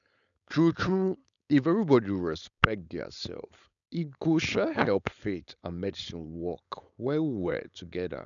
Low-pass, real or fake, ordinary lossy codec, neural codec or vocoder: 7.2 kHz; fake; none; codec, 16 kHz, 4.8 kbps, FACodec